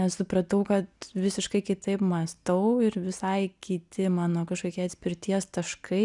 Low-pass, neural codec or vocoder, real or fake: 10.8 kHz; none; real